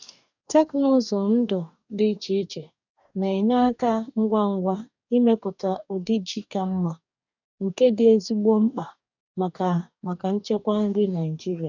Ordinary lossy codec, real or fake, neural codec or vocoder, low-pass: none; fake; codec, 44.1 kHz, 2.6 kbps, DAC; 7.2 kHz